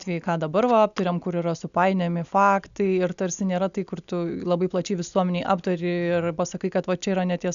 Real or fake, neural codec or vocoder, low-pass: real; none; 7.2 kHz